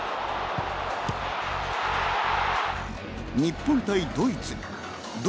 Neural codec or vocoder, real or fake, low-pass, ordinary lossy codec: none; real; none; none